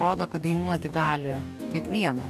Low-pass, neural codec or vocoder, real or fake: 14.4 kHz; codec, 44.1 kHz, 2.6 kbps, DAC; fake